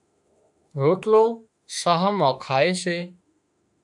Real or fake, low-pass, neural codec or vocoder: fake; 10.8 kHz; autoencoder, 48 kHz, 32 numbers a frame, DAC-VAE, trained on Japanese speech